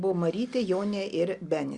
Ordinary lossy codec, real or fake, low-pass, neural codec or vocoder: Opus, 64 kbps; real; 10.8 kHz; none